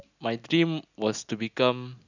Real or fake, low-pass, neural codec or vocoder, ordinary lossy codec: real; 7.2 kHz; none; none